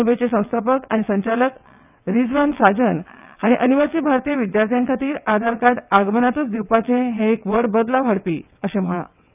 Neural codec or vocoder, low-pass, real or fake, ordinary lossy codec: vocoder, 22.05 kHz, 80 mel bands, WaveNeXt; 3.6 kHz; fake; none